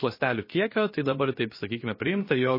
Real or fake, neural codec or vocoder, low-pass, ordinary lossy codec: fake; codec, 16 kHz, about 1 kbps, DyCAST, with the encoder's durations; 5.4 kHz; MP3, 24 kbps